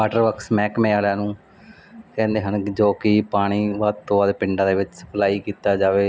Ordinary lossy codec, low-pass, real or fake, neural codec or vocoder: none; none; real; none